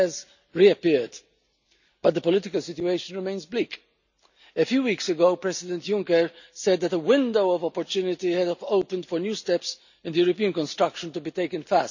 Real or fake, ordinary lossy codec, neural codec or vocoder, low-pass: real; none; none; 7.2 kHz